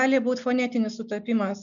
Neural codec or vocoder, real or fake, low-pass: none; real; 7.2 kHz